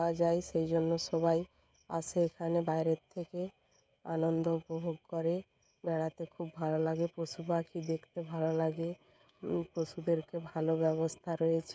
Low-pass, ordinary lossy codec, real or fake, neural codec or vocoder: none; none; fake; codec, 16 kHz, 16 kbps, FreqCodec, smaller model